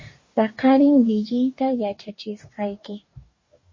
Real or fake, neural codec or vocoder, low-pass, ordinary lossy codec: fake; codec, 44.1 kHz, 2.6 kbps, DAC; 7.2 kHz; MP3, 32 kbps